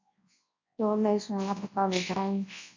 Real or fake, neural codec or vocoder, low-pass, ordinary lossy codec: fake; codec, 24 kHz, 0.9 kbps, WavTokenizer, large speech release; 7.2 kHz; MP3, 48 kbps